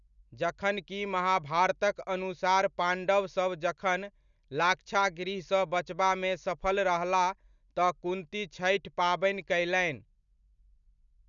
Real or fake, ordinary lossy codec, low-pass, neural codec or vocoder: real; none; 7.2 kHz; none